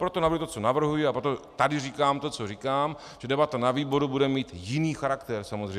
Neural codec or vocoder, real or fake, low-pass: none; real; 14.4 kHz